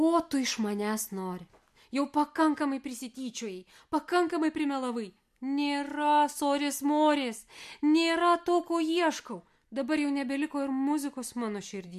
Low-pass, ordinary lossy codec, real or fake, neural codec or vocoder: 14.4 kHz; MP3, 64 kbps; real; none